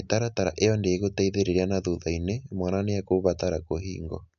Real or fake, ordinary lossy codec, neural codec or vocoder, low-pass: real; none; none; 7.2 kHz